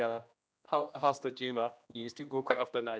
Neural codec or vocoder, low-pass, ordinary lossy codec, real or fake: codec, 16 kHz, 1 kbps, X-Codec, HuBERT features, trained on general audio; none; none; fake